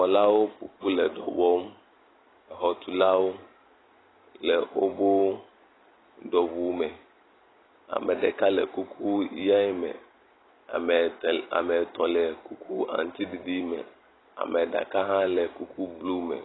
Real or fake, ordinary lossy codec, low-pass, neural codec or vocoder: real; AAC, 16 kbps; 7.2 kHz; none